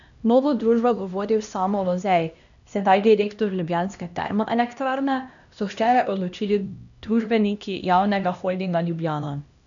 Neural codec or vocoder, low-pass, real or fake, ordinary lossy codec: codec, 16 kHz, 1 kbps, X-Codec, HuBERT features, trained on LibriSpeech; 7.2 kHz; fake; none